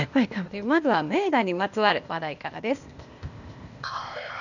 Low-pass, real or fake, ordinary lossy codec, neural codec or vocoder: 7.2 kHz; fake; none; codec, 16 kHz, 0.8 kbps, ZipCodec